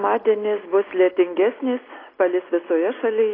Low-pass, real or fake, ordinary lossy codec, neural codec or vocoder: 5.4 kHz; real; AAC, 24 kbps; none